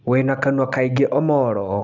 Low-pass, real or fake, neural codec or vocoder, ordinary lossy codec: 7.2 kHz; real; none; none